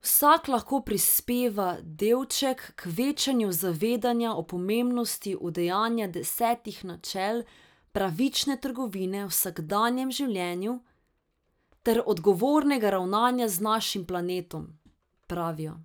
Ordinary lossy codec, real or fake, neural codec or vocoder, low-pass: none; real; none; none